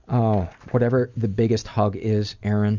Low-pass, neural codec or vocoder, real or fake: 7.2 kHz; none; real